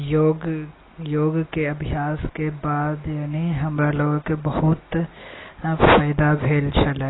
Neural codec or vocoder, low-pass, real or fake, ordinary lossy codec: none; 7.2 kHz; real; AAC, 16 kbps